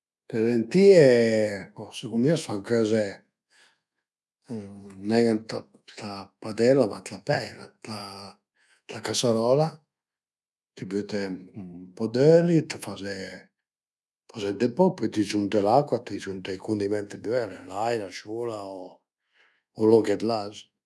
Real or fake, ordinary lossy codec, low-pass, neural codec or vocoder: fake; none; none; codec, 24 kHz, 1.2 kbps, DualCodec